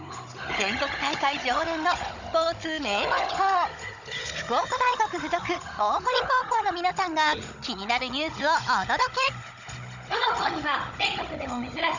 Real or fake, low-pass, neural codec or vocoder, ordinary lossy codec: fake; 7.2 kHz; codec, 16 kHz, 16 kbps, FunCodec, trained on Chinese and English, 50 frames a second; none